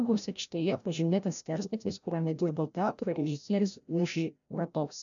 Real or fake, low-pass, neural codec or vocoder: fake; 7.2 kHz; codec, 16 kHz, 0.5 kbps, FreqCodec, larger model